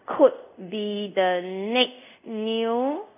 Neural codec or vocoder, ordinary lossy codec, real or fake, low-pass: codec, 24 kHz, 0.5 kbps, DualCodec; none; fake; 3.6 kHz